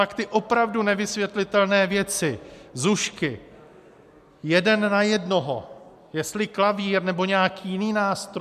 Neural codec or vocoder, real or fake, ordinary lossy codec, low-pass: none; real; MP3, 96 kbps; 14.4 kHz